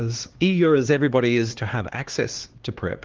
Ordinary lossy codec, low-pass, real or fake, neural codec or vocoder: Opus, 16 kbps; 7.2 kHz; fake; codec, 16 kHz, 2 kbps, X-Codec, HuBERT features, trained on LibriSpeech